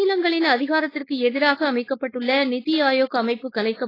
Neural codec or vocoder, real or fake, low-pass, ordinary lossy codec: codec, 16 kHz, 4.8 kbps, FACodec; fake; 5.4 kHz; AAC, 24 kbps